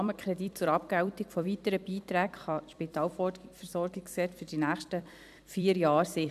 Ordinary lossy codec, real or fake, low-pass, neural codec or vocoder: AAC, 96 kbps; fake; 14.4 kHz; vocoder, 44.1 kHz, 128 mel bands every 256 samples, BigVGAN v2